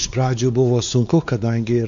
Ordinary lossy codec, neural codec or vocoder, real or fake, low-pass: AAC, 64 kbps; codec, 16 kHz, 4 kbps, X-Codec, WavLM features, trained on Multilingual LibriSpeech; fake; 7.2 kHz